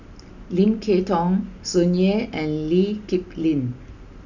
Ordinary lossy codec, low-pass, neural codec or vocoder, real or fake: none; 7.2 kHz; none; real